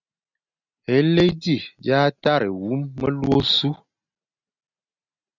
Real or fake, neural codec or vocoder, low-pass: real; none; 7.2 kHz